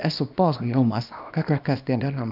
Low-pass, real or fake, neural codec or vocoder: 5.4 kHz; fake; codec, 24 kHz, 0.9 kbps, WavTokenizer, small release